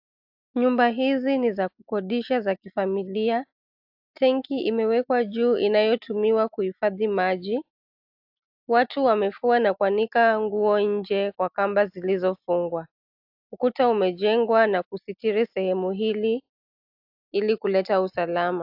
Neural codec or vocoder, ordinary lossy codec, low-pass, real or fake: none; AAC, 48 kbps; 5.4 kHz; real